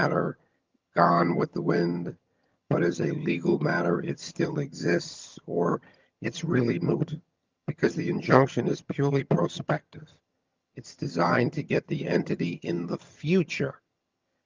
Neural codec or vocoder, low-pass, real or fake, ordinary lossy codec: vocoder, 22.05 kHz, 80 mel bands, HiFi-GAN; 7.2 kHz; fake; Opus, 24 kbps